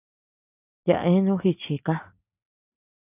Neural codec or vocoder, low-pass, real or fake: none; 3.6 kHz; real